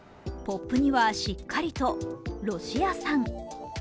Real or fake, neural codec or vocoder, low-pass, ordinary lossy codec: real; none; none; none